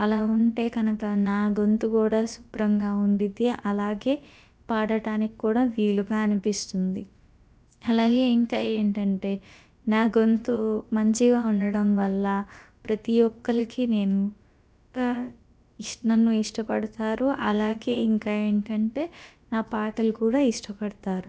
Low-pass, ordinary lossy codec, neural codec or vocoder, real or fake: none; none; codec, 16 kHz, about 1 kbps, DyCAST, with the encoder's durations; fake